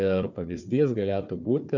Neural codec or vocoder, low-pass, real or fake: codec, 16 kHz, 4 kbps, FunCodec, trained on Chinese and English, 50 frames a second; 7.2 kHz; fake